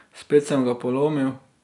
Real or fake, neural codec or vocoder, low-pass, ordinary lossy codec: real; none; 10.8 kHz; none